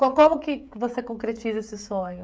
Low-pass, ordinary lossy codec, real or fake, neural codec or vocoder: none; none; fake; codec, 16 kHz, 16 kbps, FreqCodec, smaller model